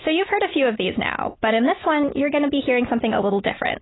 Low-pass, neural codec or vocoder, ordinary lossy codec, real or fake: 7.2 kHz; none; AAC, 16 kbps; real